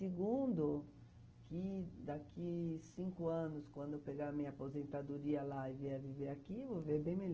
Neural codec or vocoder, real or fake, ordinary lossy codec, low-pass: none; real; Opus, 16 kbps; 7.2 kHz